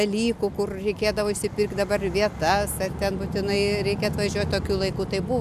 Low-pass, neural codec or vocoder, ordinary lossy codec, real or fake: 14.4 kHz; none; AAC, 96 kbps; real